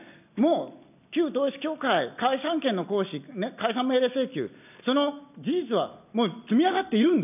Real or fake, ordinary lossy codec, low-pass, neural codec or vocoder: real; none; 3.6 kHz; none